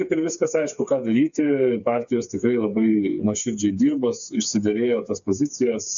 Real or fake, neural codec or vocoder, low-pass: fake; codec, 16 kHz, 4 kbps, FreqCodec, smaller model; 7.2 kHz